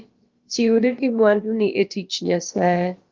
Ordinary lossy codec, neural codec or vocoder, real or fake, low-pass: Opus, 32 kbps; codec, 16 kHz, about 1 kbps, DyCAST, with the encoder's durations; fake; 7.2 kHz